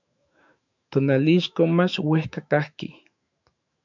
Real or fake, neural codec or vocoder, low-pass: fake; autoencoder, 48 kHz, 128 numbers a frame, DAC-VAE, trained on Japanese speech; 7.2 kHz